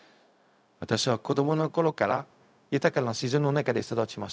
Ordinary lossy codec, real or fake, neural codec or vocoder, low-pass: none; fake; codec, 16 kHz, 0.4 kbps, LongCat-Audio-Codec; none